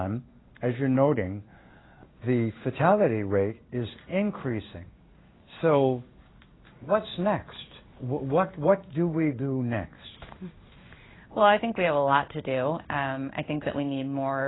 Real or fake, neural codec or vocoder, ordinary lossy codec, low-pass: fake; codec, 16 kHz in and 24 kHz out, 1 kbps, XY-Tokenizer; AAC, 16 kbps; 7.2 kHz